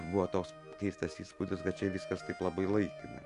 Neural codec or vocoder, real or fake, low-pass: none; real; 10.8 kHz